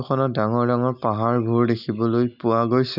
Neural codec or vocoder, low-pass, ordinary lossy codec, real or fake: none; 5.4 kHz; none; real